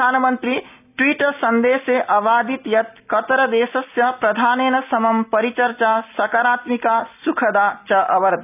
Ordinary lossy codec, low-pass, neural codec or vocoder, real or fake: none; 3.6 kHz; none; real